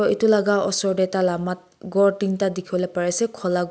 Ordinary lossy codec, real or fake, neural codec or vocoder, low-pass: none; real; none; none